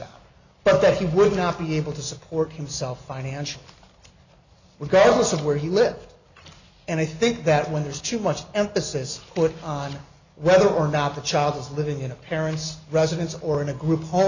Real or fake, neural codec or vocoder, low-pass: real; none; 7.2 kHz